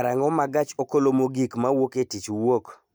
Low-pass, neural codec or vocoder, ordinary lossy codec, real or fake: none; none; none; real